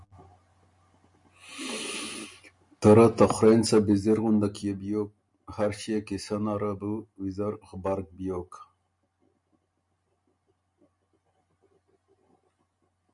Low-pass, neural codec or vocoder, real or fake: 10.8 kHz; none; real